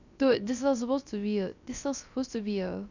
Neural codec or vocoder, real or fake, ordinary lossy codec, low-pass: codec, 16 kHz, 0.3 kbps, FocalCodec; fake; none; 7.2 kHz